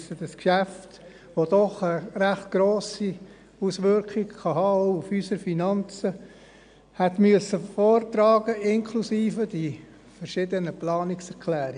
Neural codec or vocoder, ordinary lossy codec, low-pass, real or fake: none; none; 9.9 kHz; real